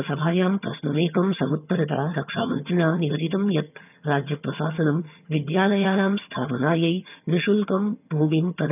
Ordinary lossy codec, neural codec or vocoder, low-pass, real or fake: none; vocoder, 22.05 kHz, 80 mel bands, HiFi-GAN; 3.6 kHz; fake